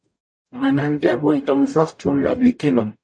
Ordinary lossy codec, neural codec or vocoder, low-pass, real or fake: MP3, 48 kbps; codec, 44.1 kHz, 0.9 kbps, DAC; 9.9 kHz; fake